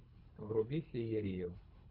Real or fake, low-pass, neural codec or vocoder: fake; 5.4 kHz; codec, 24 kHz, 3 kbps, HILCodec